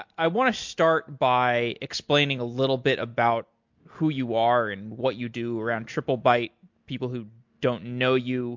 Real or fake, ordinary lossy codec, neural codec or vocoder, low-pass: real; MP3, 48 kbps; none; 7.2 kHz